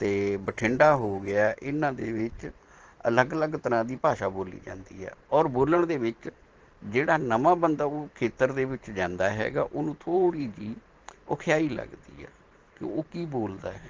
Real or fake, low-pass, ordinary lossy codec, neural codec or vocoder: fake; 7.2 kHz; Opus, 16 kbps; vocoder, 44.1 kHz, 128 mel bands every 512 samples, BigVGAN v2